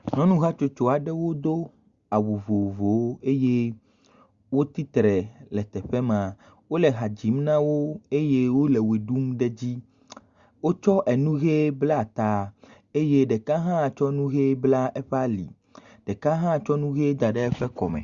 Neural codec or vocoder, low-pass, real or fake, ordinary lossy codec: none; 7.2 kHz; real; Opus, 64 kbps